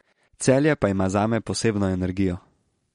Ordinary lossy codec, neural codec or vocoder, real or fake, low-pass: MP3, 48 kbps; none; real; 19.8 kHz